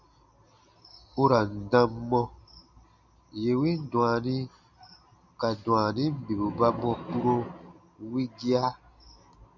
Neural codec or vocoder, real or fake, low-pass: none; real; 7.2 kHz